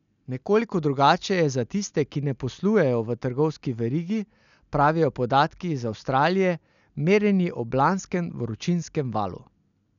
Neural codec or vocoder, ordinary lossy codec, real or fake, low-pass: none; none; real; 7.2 kHz